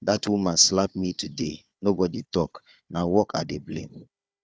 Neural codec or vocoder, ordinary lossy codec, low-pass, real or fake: codec, 16 kHz, 4 kbps, FunCodec, trained on Chinese and English, 50 frames a second; none; none; fake